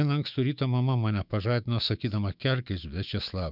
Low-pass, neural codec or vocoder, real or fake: 5.4 kHz; autoencoder, 48 kHz, 128 numbers a frame, DAC-VAE, trained on Japanese speech; fake